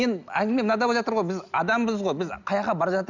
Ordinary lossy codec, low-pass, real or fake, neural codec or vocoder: none; 7.2 kHz; real; none